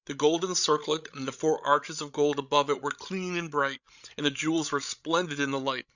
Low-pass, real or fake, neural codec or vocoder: 7.2 kHz; fake; codec, 16 kHz, 16 kbps, FreqCodec, larger model